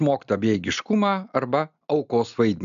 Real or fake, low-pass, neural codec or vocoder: real; 7.2 kHz; none